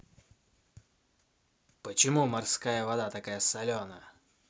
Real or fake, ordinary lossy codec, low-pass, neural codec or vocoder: real; none; none; none